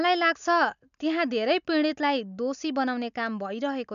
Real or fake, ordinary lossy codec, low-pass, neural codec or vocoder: real; none; 7.2 kHz; none